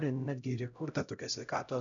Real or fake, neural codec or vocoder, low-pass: fake; codec, 16 kHz, 0.5 kbps, X-Codec, HuBERT features, trained on LibriSpeech; 7.2 kHz